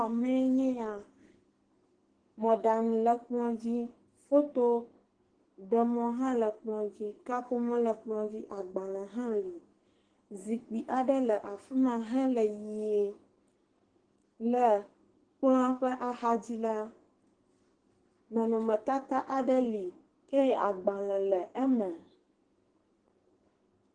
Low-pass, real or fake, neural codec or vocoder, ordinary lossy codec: 9.9 kHz; fake; codec, 44.1 kHz, 2.6 kbps, SNAC; Opus, 16 kbps